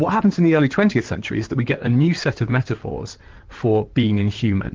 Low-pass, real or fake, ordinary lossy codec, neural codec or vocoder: 7.2 kHz; fake; Opus, 16 kbps; codec, 16 kHz in and 24 kHz out, 2.2 kbps, FireRedTTS-2 codec